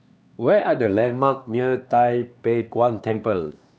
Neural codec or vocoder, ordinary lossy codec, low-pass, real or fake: codec, 16 kHz, 2 kbps, X-Codec, HuBERT features, trained on LibriSpeech; none; none; fake